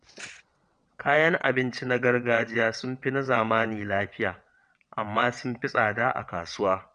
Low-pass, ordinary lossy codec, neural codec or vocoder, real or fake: 9.9 kHz; none; vocoder, 22.05 kHz, 80 mel bands, WaveNeXt; fake